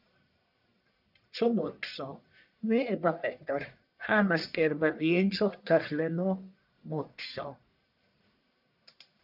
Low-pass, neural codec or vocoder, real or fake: 5.4 kHz; codec, 44.1 kHz, 1.7 kbps, Pupu-Codec; fake